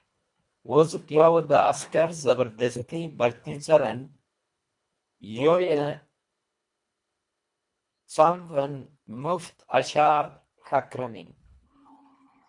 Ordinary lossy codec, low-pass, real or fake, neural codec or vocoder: MP3, 64 kbps; 10.8 kHz; fake; codec, 24 kHz, 1.5 kbps, HILCodec